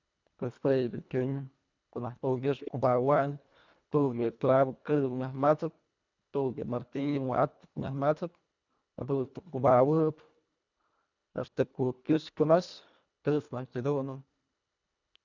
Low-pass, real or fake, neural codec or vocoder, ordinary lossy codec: 7.2 kHz; fake; codec, 24 kHz, 1.5 kbps, HILCodec; none